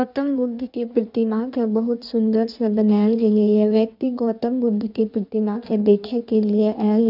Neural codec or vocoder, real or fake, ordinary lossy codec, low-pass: codec, 16 kHz in and 24 kHz out, 1.1 kbps, FireRedTTS-2 codec; fake; none; 5.4 kHz